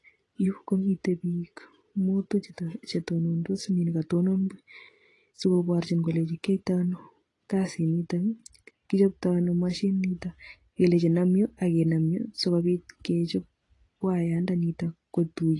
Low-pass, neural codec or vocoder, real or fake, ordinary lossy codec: 10.8 kHz; none; real; AAC, 32 kbps